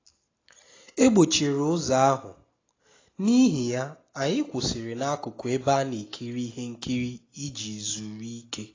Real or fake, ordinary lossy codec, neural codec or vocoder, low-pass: real; AAC, 32 kbps; none; 7.2 kHz